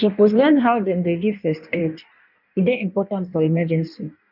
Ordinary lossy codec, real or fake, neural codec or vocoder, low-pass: none; fake; codec, 16 kHz in and 24 kHz out, 1.1 kbps, FireRedTTS-2 codec; 5.4 kHz